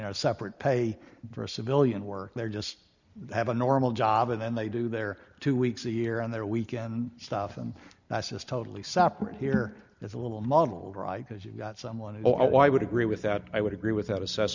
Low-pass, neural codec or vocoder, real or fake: 7.2 kHz; none; real